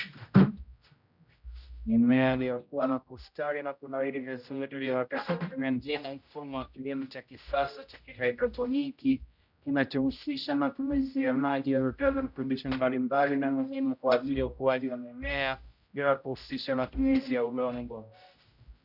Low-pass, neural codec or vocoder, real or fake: 5.4 kHz; codec, 16 kHz, 0.5 kbps, X-Codec, HuBERT features, trained on general audio; fake